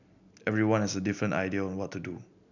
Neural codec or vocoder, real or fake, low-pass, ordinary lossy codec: none; real; 7.2 kHz; none